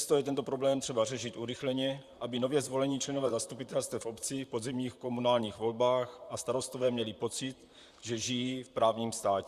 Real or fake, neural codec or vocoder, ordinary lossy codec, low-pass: fake; vocoder, 44.1 kHz, 128 mel bands, Pupu-Vocoder; Opus, 64 kbps; 14.4 kHz